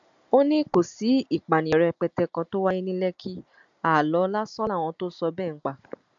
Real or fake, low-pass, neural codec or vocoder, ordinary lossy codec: real; 7.2 kHz; none; AAC, 64 kbps